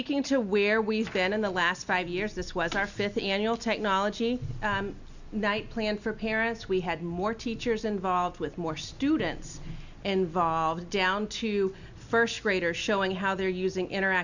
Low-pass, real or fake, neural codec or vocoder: 7.2 kHz; real; none